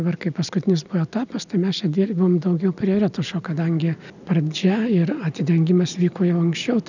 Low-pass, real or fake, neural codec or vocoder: 7.2 kHz; real; none